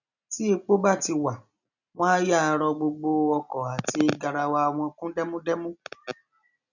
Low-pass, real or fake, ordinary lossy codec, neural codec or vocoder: 7.2 kHz; real; none; none